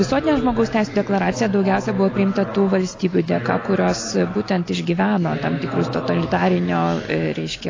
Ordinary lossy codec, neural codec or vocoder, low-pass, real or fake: AAC, 32 kbps; none; 7.2 kHz; real